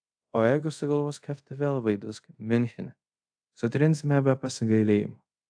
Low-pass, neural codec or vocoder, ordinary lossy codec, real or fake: 9.9 kHz; codec, 24 kHz, 0.5 kbps, DualCodec; AAC, 64 kbps; fake